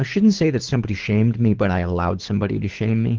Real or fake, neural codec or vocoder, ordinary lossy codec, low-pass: fake; codec, 24 kHz, 6 kbps, HILCodec; Opus, 16 kbps; 7.2 kHz